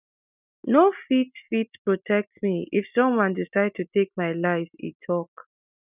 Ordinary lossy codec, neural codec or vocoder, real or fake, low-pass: none; none; real; 3.6 kHz